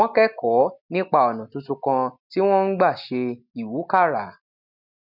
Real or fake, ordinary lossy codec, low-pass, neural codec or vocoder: real; none; 5.4 kHz; none